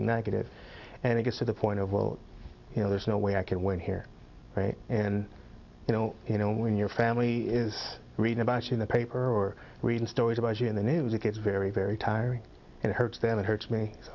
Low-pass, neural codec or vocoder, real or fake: 7.2 kHz; none; real